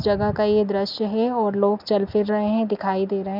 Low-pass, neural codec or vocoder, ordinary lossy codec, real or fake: 5.4 kHz; none; none; real